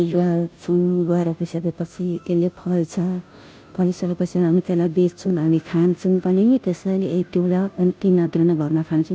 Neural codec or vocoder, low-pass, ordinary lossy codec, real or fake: codec, 16 kHz, 0.5 kbps, FunCodec, trained on Chinese and English, 25 frames a second; none; none; fake